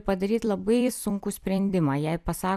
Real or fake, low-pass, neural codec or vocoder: fake; 14.4 kHz; vocoder, 44.1 kHz, 128 mel bands every 256 samples, BigVGAN v2